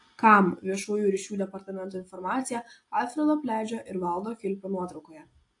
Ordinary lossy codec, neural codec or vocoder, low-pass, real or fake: AAC, 48 kbps; none; 10.8 kHz; real